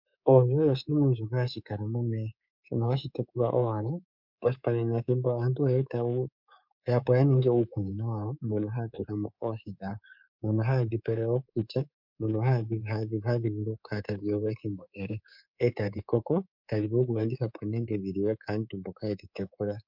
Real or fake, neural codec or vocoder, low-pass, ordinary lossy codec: fake; codec, 24 kHz, 3.1 kbps, DualCodec; 5.4 kHz; MP3, 48 kbps